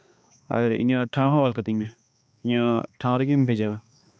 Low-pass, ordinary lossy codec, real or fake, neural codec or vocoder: none; none; fake; codec, 16 kHz, 2 kbps, X-Codec, HuBERT features, trained on balanced general audio